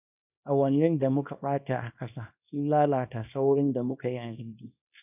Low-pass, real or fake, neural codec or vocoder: 3.6 kHz; fake; codec, 24 kHz, 0.9 kbps, WavTokenizer, small release